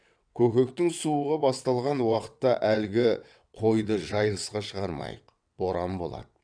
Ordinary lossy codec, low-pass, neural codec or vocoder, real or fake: none; 9.9 kHz; vocoder, 22.05 kHz, 80 mel bands, WaveNeXt; fake